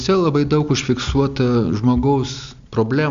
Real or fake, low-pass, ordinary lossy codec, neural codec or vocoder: real; 7.2 kHz; MP3, 64 kbps; none